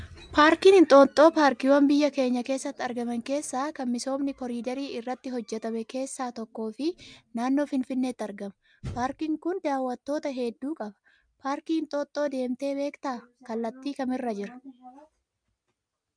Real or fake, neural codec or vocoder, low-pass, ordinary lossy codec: real; none; 9.9 kHz; MP3, 96 kbps